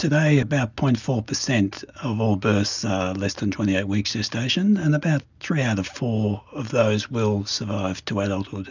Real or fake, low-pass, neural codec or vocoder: real; 7.2 kHz; none